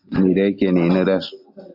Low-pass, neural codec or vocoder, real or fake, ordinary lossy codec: 5.4 kHz; none; real; AAC, 48 kbps